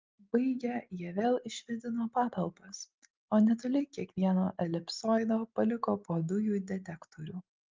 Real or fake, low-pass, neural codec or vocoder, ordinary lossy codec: real; 7.2 kHz; none; Opus, 32 kbps